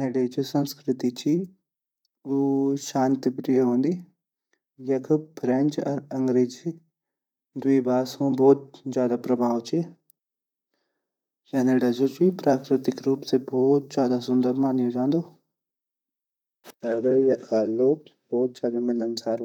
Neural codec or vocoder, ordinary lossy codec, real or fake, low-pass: vocoder, 44.1 kHz, 128 mel bands, Pupu-Vocoder; none; fake; 19.8 kHz